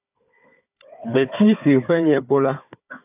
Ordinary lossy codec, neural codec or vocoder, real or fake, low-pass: AAC, 24 kbps; codec, 16 kHz, 4 kbps, FunCodec, trained on Chinese and English, 50 frames a second; fake; 3.6 kHz